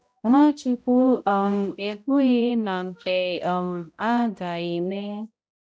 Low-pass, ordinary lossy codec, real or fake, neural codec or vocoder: none; none; fake; codec, 16 kHz, 0.5 kbps, X-Codec, HuBERT features, trained on balanced general audio